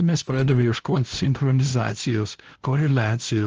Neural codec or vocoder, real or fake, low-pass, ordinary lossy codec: codec, 16 kHz, 0.5 kbps, FunCodec, trained on LibriTTS, 25 frames a second; fake; 7.2 kHz; Opus, 16 kbps